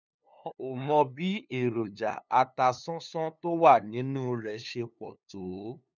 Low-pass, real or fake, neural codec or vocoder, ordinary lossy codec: 7.2 kHz; fake; codec, 16 kHz, 2 kbps, FunCodec, trained on LibriTTS, 25 frames a second; none